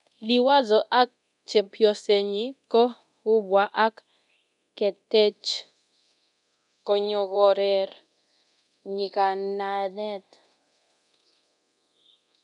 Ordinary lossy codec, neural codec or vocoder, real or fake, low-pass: none; codec, 24 kHz, 0.9 kbps, DualCodec; fake; 10.8 kHz